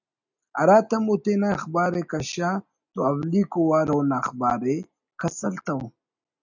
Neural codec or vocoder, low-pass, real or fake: none; 7.2 kHz; real